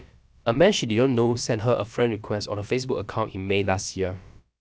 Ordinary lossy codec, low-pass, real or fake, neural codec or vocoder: none; none; fake; codec, 16 kHz, about 1 kbps, DyCAST, with the encoder's durations